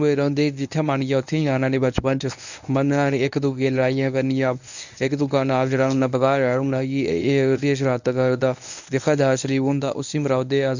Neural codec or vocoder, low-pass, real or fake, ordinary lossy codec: codec, 24 kHz, 0.9 kbps, WavTokenizer, medium speech release version 1; 7.2 kHz; fake; none